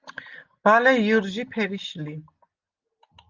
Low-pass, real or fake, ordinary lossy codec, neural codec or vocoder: 7.2 kHz; real; Opus, 24 kbps; none